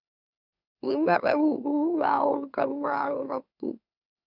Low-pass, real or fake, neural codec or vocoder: 5.4 kHz; fake; autoencoder, 44.1 kHz, a latent of 192 numbers a frame, MeloTTS